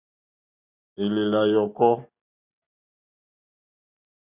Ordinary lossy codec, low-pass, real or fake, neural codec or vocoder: Opus, 64 kbps; 3.6 kHz; real; none